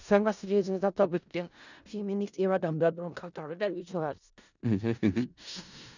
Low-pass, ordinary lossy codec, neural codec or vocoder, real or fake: 7.2 kHz; none; codec, 16 kHz in and 24 kHz out, 0.4 kbps, LongCat-Audio-Codec, four codebook decoder; fake